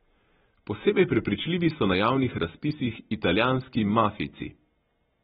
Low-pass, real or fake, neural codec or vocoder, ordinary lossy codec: 14.4 kHz; real; none; AAC, 16 kbps